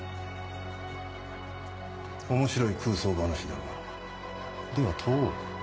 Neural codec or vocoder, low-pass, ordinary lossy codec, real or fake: none; none; none; real